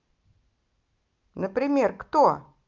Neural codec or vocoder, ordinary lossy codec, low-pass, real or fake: none; Opus, 24 kbps; 7.2 kHz; real